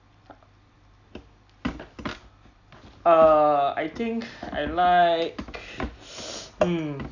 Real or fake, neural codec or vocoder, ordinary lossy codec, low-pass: real; none; none; 7.2 kHz